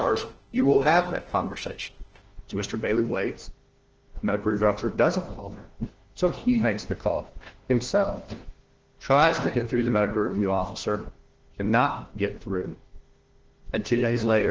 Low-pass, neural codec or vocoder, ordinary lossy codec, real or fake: 7.2 kHz; codec, 16 kHz, 1 kbps, FunCodec, trained on LibriTTS, 50 frames a second; Opus, 16 kbps; fake